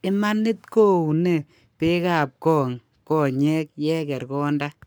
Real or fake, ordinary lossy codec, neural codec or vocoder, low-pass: fake; none; codec, 44.1 kHz, 7.8 kbps, Pupu-Codec; none